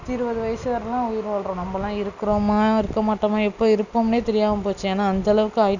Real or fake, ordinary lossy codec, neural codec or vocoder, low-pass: real; none; none; 7.2 kHz